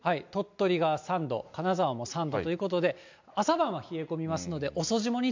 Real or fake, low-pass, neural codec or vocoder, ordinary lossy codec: real; 7.2 kHz; none; MP3, 64 kbps